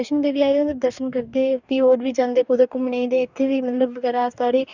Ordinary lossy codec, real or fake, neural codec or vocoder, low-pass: none; fake; codec, 44.1 kHz, 2.6 kbps, DAC; 7.2 kHz